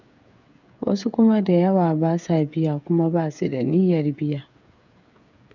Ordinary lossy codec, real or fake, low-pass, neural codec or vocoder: none; fake; 7.2 kHz; codec, 16 kHz, 8 kbps, FreqCodec, smaller model